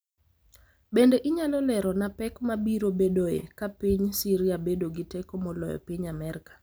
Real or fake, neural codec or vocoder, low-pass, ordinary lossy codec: real; none; none; none